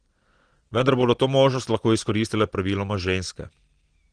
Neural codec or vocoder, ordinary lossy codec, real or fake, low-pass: none; Opus, 16 kbps; real; 9.9 kHz